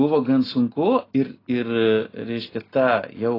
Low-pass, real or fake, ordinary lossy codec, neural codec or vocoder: 5.4 kHz; real; AAC, 24 kbps; none